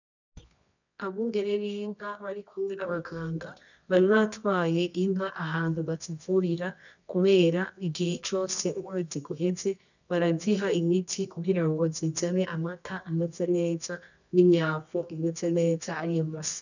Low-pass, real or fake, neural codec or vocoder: 7.2 kHz; fake; codec, 24 kHz, 0.9 kbps, WavTokenizer, medium music audio release